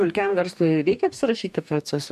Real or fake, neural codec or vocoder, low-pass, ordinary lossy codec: fake; codec, 44.1 kHz, 2.6 kbps, DAC; 14.4 kHz; MP3, 96 kbps